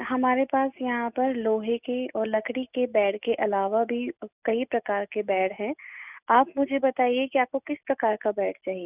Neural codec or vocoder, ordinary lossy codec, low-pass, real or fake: none; none; 3.6 kHz; real